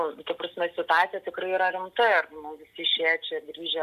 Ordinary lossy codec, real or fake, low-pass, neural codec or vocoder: AAC, 96 kbps; real; 14.4 kHz; none